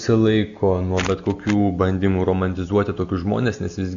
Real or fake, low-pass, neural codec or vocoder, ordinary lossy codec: real; 7.2 kHz; none; MP3, 64 kbps